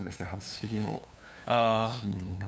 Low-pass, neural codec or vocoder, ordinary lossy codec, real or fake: none; codec, 16 kHz, 2 kbps, FunCodec, trained on LibriTTS, 25 frames a second; none; fake